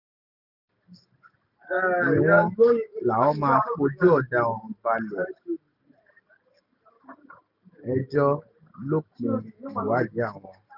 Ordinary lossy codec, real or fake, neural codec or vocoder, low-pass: none; real; none; 5.4 kHz